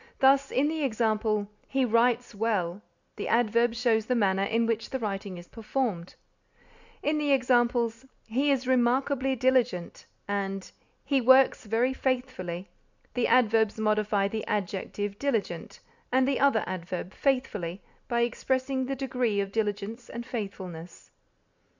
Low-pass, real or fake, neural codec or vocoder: 7.2 kHz; real; none